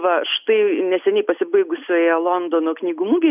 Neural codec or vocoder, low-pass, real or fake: none; 3.6 kHz; real